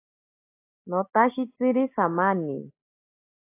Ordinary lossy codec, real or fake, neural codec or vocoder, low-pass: AAC, 24 kbps; real; none; 3.6 kHz